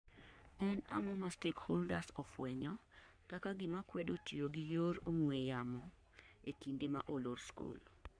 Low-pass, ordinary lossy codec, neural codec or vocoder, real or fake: 9.9 kHz; none; codec, 44.1 kHz, 3.4 kbps, Pupu-Codec; fake